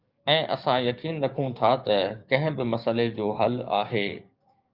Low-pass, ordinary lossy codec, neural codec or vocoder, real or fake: 5.4 kHz; Opus, 24 kbps; codec, 16 kHz, 6 kbps, DAC; fake